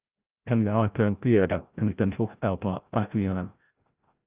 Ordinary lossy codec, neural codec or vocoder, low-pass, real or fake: Opus, 32 kbps; codec, 16 kHz, 0.5 kbps, FreqCodec, larger model; 3.6 kHz; fake